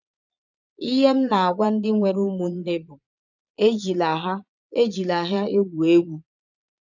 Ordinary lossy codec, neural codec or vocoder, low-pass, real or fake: none; vocoder, 22.05 kHz, 80 mel bands, Vocos; 7.2 kHz; fake